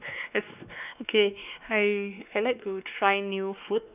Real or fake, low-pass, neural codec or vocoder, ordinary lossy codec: fake; 3.6 kHz; codec, 16 kHz, 2 kbps, X-Codec, WavLM features, trained on Multilingual LibriSpeech; none